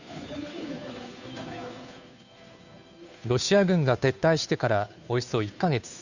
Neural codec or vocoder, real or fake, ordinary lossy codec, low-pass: codec, 16 kHz, 2 kbps, FunCodec, trained on Chinese and English, 25 frames a second; fake; none; 7.2 kHz